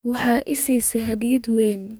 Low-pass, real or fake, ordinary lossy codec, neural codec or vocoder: none; fake; none; codec, 44.1 kHz, 2.6 kbps, DAC